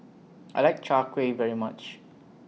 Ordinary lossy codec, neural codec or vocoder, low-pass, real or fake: none; none; none; real